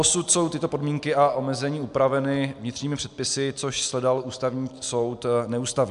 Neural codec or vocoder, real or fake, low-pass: none; real; 10.8 kHz